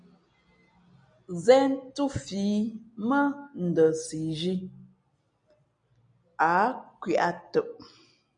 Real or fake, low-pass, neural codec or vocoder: real; 9.9 kHz; none